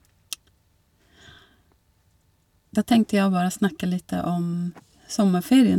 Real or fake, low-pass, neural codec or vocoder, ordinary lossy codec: real; 19.8 kHz; none; none